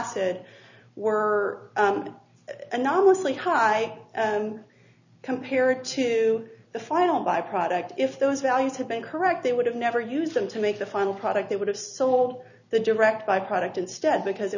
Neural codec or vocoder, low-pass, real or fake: none; 7.2 kHz; real